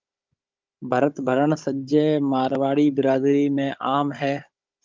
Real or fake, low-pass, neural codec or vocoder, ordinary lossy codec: fake; 7.2 kHz; codec, 16 kHz, 16 kbps, FunCodec, trained on Chinese and English, 50 frames a second; Opus, 24 kbps